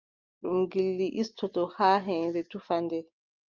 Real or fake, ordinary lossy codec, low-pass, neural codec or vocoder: real; Opus, 24 kbps; 7.2 kHz; none